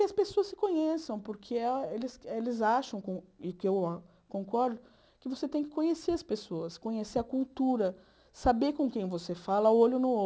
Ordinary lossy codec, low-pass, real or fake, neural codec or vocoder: none; none; real; none